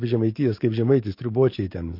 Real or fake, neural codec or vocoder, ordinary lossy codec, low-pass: fake; codec, 44.1 kHz, 7.8 kbps, DAC; MP3, 32 kbps; 5.4 kHz